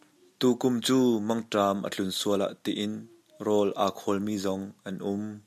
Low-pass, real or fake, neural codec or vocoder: 14.4 kHz; real; none